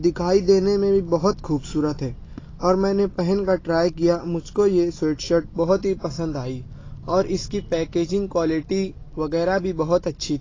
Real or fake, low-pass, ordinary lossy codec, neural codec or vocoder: real; 7.2 kHz; AAC, 32 kbps; none